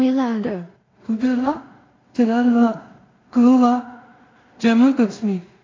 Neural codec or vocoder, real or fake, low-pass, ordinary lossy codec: codec, 16 kHz in and 24 kHz out, 0.4 kbps, LongCat-Audio-Codec, two codebook decoder; fake; 7.2 kHz; AAC, 32 kbps